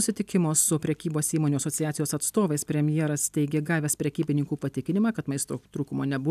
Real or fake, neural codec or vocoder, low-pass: real; none; 14.4 kHz